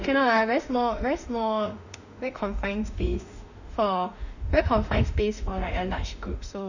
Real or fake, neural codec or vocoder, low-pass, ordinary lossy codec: fake; autoencoder, 48 kHz, 32 numbers a frame, DAC-VAE, trained on Japanese speech; 7.2 kHz; none